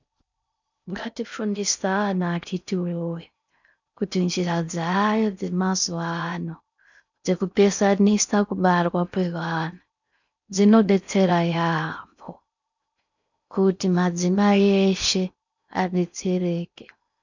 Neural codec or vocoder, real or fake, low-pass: codec, 16 kHz in and 24 kHz out, 0.6 kbps, FocalCodec, streaming, 4096 codes; fake; 7.2 kHz